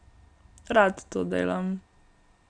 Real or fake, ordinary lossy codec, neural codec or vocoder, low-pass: real; none; none; 9.9 kHz